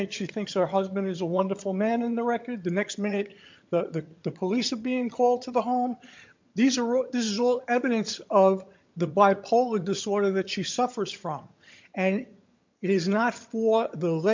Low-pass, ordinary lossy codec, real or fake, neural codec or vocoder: 7.2 kHz; MP3, 48 kbps; fake; vocoder, 22.05 kHz, 80 mel bands, HiFi-GAN